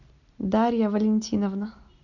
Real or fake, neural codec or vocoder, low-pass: real; none; 7.2 kHz